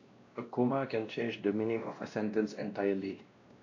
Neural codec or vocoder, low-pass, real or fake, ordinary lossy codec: codec, 16 kHz, 1 kbps, X-Codec, WavLM features, trained on Multilingual LibriSpeech; 7.2 kHz; fake; AAC, 48 kbps